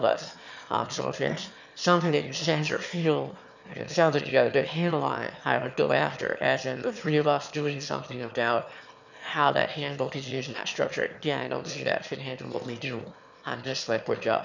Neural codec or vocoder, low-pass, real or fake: autoencoder, 22.05 kHz, a latent of 192 numbers a frame, VITS, trained on one speaker; 7.2 kHz; fake